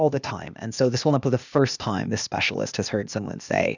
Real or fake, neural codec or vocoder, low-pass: fake; codec, 16 kHz, 0.8 kbps, ZipCodec; 7.2 kHz